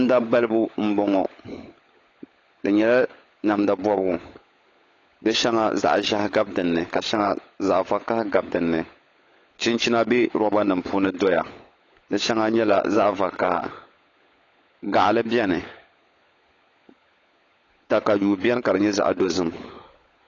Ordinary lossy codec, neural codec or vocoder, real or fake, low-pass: AAC, 32 kbps; codec, 16 kHz, 16 kbps, FunCodec, trained on LibriTTS, 50 frames a second; fake; 7.2 kHz